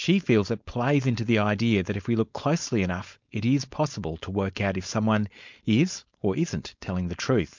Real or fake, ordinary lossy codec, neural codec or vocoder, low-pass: fake; MP3, 64 kbps; codec, 16 kHz, 4.8 kbps, FACodec; 7.2 kHz